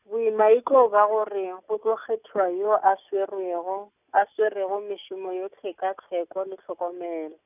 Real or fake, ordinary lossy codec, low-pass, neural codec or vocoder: real; none; 3.6 kHz; none